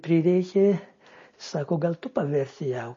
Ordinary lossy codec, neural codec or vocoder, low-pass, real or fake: MP3, 32 kbps; none; 7.2 kHz; real